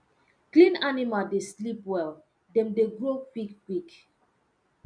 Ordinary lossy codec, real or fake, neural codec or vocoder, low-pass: none; real; none; 9.9 kHz